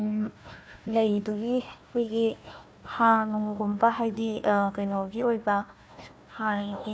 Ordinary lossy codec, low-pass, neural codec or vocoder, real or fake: none; none; codec, 16 kHz, 1 kbps, FunCodec, trained on Chinese and English, 50 frames a second; fake